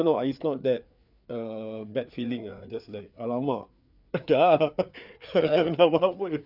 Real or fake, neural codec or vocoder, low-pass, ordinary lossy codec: fake; codec, 16 kHz, 4 kbps, FreqCodec, larger model; 5.4 kHz; none